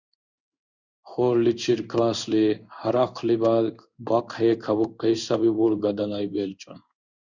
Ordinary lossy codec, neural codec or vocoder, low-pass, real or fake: Opus, 64 kbps; codec, 16 kHz in and 24 kHz out, 1 kbps, XY-Tokenizer; 7.2 kHz; fake